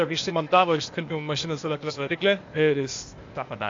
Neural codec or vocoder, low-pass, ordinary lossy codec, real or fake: codec, 16 kHz, 0.8 kbps, ZipCodec; 7.2 kHz; AAC, 48 kbps; fake